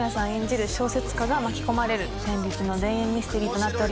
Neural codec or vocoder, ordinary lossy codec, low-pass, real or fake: none; none; none; real